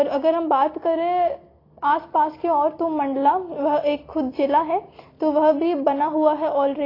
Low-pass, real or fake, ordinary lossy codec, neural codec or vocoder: 5.4 kHz; real; AAC, 24 kbps; none